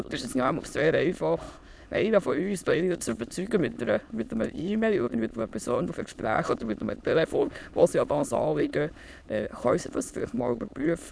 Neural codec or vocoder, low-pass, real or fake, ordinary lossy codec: autoencoder, 22.05 kHz, a latent of 192 numbers a frame, VITS, trained on many speakers; none; fake; none